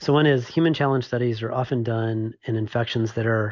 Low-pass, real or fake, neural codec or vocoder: 7.2 kHz; real; none